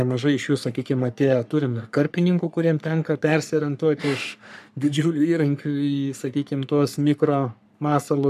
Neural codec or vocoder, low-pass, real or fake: codec, 44.1 kHz, 3.4 kbps, Pupu-Codec; 14.4 kHz; fake